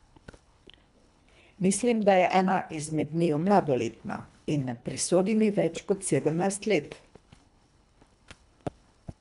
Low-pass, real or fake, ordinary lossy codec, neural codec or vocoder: 10.8 kHz; fake; none; codec, 24 kHz, 1.5 kbps, HILCodec